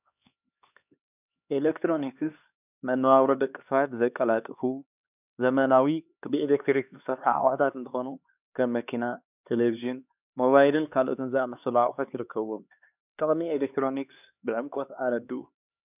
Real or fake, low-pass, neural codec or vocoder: fake; 3.6 kHz; codec, 16 kHz, 2 kbps, X-Codec, HuBERT features, trained on LibriSpeech